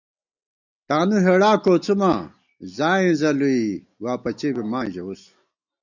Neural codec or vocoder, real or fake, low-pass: none; real; 7.2 kHz